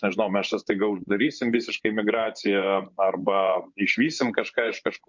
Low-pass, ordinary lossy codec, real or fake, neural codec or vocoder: 7.2 kHz; MP3, 64 kbps; fake; vocoder, 24 kHz, 100 mel bands, Vocos